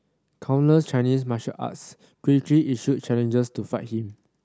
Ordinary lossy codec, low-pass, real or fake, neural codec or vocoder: none; none; real; none